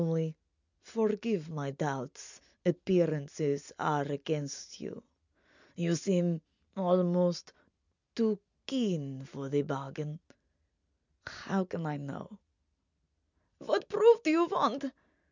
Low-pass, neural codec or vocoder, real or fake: 7.2 kHz; none; real